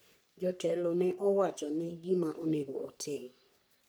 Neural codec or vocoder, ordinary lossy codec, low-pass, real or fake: codec, 44.1 kHz, 3.4 kbps, Pupu-Codec; none; none; fake